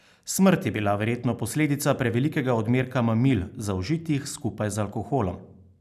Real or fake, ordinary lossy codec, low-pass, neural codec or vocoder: real; none; 14.4 kHz; none